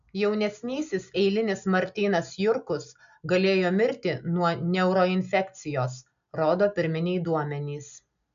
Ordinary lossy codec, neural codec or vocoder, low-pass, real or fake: AAC, 96 kbps; none; 7.2 kHz; real